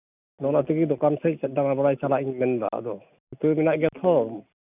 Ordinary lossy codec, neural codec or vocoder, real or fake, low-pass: none; none; real; 3.6 kHz